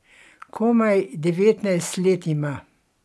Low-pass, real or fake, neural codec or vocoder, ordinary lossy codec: none; real; none; none